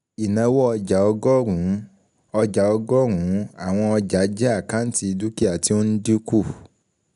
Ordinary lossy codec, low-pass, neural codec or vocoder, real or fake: none; 10.8 kHz; none; real